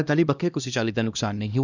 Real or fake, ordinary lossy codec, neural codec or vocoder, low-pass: fake; none; codec, 16 kHz, 1 kbps, X-Codec, HuBERT features, trained on LibriSpeech; 7.2 kHz